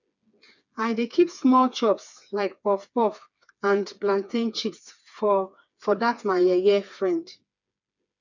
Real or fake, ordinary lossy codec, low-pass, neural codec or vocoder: fake; none; 7.2 kHz; codec, 16 kHz, 4 kbps, FreqCodec, smaller model